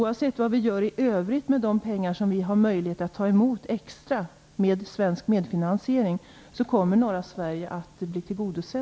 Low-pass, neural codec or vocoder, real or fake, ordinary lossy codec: none; none; real; none